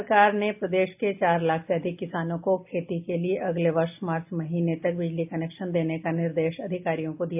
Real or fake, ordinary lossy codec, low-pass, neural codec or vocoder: real; AAC, 32 kbps; 3.6 kHz; none